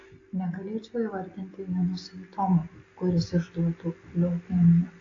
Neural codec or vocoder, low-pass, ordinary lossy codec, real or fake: none; 7.2 kHz; AAC, 32 kbps; real